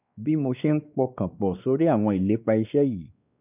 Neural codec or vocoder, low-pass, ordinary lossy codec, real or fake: codec, 16 kHz, 2 kbps, X-Codec, WavLM features, trained on Multilingual LibriSpeech; 3.6 kHz; none; fake